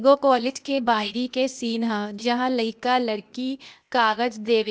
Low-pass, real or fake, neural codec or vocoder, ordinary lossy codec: none; fake; codec, 16 kHz, 0.8 kbps, ZipCodec; none